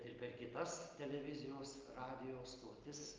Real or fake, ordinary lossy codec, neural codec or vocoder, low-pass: real; Opus, 32 kbps; none; 7.2 kHz